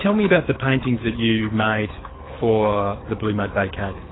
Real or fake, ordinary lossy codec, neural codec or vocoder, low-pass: fake; AAC, 16 kbps; codec, 24 kHz, 6 kbps, HILCodec; 7.2 kHz